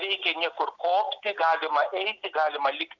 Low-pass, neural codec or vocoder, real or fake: 7.2 kHz; none; real